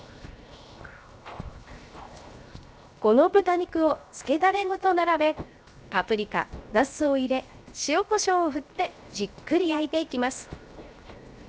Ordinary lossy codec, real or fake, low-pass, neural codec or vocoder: none; fake; none; codec, 16 kHz, 0.7 kbps, FocalCodec